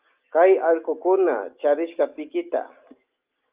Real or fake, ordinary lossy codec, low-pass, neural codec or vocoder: real; Opus, 32 kbps; 3.6 kHz; none